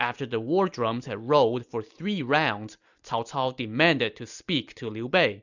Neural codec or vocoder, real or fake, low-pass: none; real; 7.2 kHz